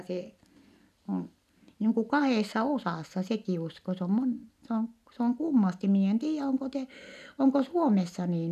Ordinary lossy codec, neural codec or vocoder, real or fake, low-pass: none; none; real; 14.4 kHz